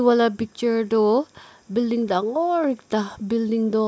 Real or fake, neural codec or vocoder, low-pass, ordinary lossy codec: real; none; none; none